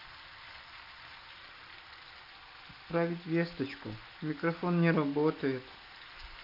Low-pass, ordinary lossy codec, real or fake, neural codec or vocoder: 5.4 kHz; none; real; none